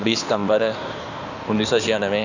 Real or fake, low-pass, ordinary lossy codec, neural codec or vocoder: fake; 7.2 kHz; none; codec, 16 kHz in and 24 kHz out, 2.2 kbps, FireRedTTS-2 codec